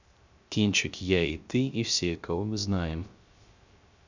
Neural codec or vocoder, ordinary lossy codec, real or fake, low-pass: codec, 16 kHz, 0.3 kbps, FocalCodec; Opus, 64 kbps; fake; 7.2 kHz